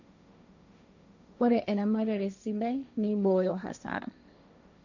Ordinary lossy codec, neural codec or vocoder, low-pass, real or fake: none; codec, 16 kHz, 1.1 kbps, Voila-Tokenizer; 7.2 kHz; fake